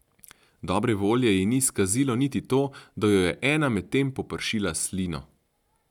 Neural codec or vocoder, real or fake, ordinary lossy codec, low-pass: none; real; none; 19.8 kHz